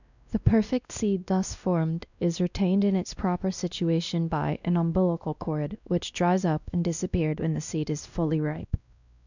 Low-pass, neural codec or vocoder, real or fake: 7.2 kHz; codec, 16 kHz, 1 kbps, X-Codec, WavLM features, trained on Multilingual LibriSpeech; fake